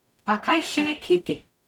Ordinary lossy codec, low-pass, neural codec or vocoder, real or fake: none; 19.8 kHz; codec, 44.1 kHz, 0.9 kbps, DAC; fake